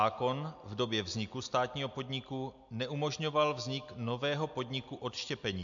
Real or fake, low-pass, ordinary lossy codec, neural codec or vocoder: real; 7.2 kHz; AAC, 64 kbps; none